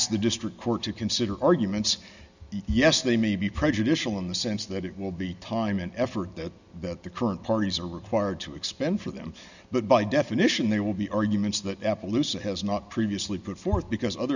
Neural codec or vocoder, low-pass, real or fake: none; 7.2 kHz; real